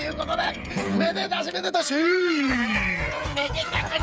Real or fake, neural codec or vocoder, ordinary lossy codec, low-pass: fake; codec, 16 kHz, 8 kbps, FreqCodec, smaller model; none; none